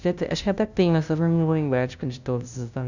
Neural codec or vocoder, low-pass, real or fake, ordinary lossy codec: codec, 16 kHz, 0.5 kbps, FunCodec, trained on LibriTTS, 25 frames a second; 7.2 kHz; fake; none